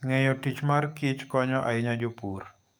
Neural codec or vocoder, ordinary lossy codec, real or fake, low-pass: codec, 44.1 kHz, 7.8 kbps, DAC; none; fake; none